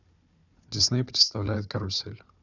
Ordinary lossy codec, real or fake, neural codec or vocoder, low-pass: none; fake; codec, 16 kHz, 4 kbps, FunCodec, trained on Chinese and English, 50 frames a second; 7.2 kHz